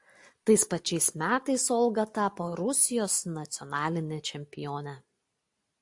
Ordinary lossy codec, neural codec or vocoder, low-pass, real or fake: AAC, 64 kbps; none; 10.8 kHz; real